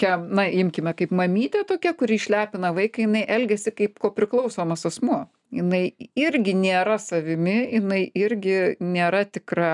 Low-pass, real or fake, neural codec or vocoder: 10.8 kHz; real; none